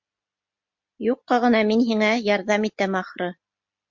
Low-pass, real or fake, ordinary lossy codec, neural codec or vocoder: 7.2 kHz; real; MP3, 48 kbps; none